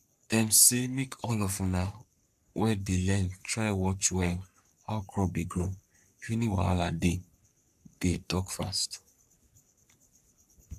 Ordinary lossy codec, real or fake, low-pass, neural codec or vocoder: none; fake; 14.4 kHz; codec, 44.1 kHz, 3.4 kbps, Pupu-Codec